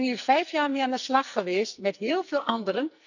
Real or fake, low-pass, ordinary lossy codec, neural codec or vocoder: fake; 7.2 kHz; none; codec, 44.1 kHz, 2.6 kbps, SNAC